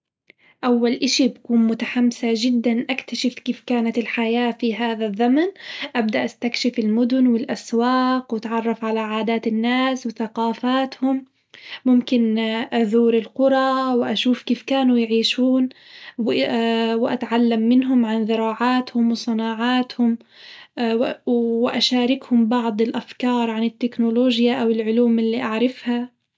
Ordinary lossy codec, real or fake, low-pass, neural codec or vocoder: none; real; none; none